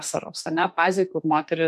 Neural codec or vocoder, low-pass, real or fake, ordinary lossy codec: autoencoder, 48 kHz, 32 numbers a frame, DAC-VAE, trained on Japanese speech; 14.4 kHz; fake; MP3, 64 kbps